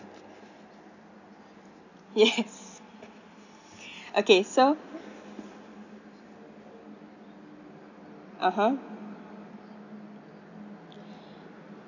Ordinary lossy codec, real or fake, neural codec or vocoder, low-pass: none; real; none; 7.2 kHz